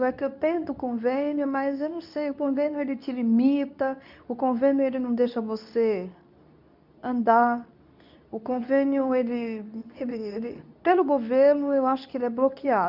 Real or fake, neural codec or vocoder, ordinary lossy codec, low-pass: fake; codec, 24 kHz, 0.9 kbps, WavTokenizer, medium speech release version 2; none; 5.4 kHz